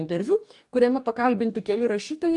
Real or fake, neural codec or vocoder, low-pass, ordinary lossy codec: fake; codec, 44.1 kHz, 2.6 kbps, DAC; 10.8 kHz; AAC, 64 kbps